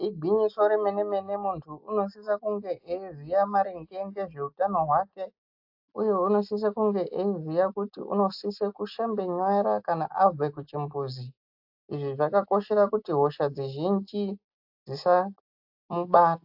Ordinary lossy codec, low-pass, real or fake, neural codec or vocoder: AAC, 48 kbps; 5.4 kHz; real; none